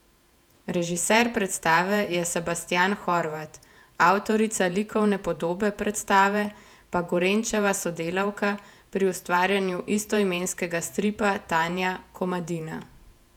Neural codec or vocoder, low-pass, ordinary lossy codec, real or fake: vocoder, 48 kHz, 128 mel bands, Vocos; 19.8 kHz; none; fake